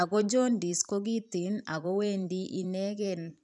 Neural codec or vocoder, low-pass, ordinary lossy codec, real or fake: vocoder, 44.1 kHz, 128 mel bands every 512 samples, BigVGAN v2; 10.8 kHz; none; fake